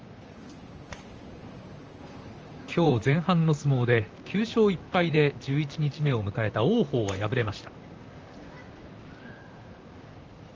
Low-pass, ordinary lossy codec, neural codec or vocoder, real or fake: 7.2 kHz; Opus, 24 kbps; vocoder, 44.1 kHz, 128 mel bands, Pupu-Vocoder; fake